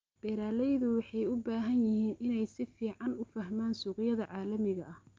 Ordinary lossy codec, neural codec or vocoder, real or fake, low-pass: Opus, 24 kbps; none; real; 7.2 kHz